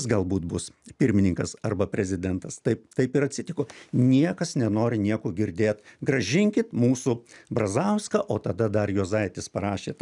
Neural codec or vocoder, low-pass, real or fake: none; 10.8 kHz; real